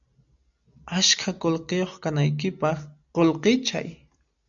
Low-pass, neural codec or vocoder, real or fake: 7.2 kHz; none; real